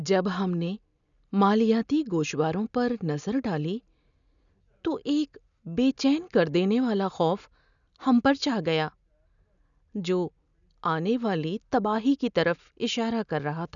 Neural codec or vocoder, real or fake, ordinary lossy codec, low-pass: none; real; none; 7.2 kHz